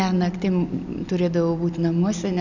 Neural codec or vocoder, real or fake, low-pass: none; real; 7.2 kHz